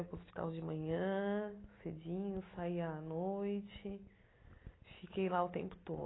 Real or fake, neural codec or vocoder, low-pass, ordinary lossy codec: real; none; 7.2 kHz; AAC, 16 kbps